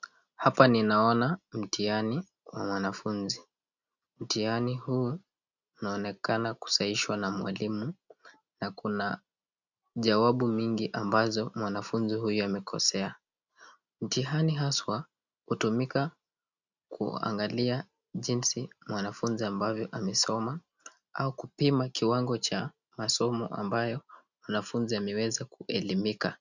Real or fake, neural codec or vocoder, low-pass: real; none; 7.2 kHz